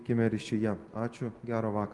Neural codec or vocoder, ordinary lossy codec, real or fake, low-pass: none; Opus, 24 kbps; real; 10.8 kHz